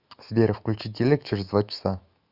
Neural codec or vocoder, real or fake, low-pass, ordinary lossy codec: autoencoder, 48 kHz, 128 numbers a frame, DAC-VAE, trained on Japanese speech; fake; 5.4 kHz; Opus, 64 kbps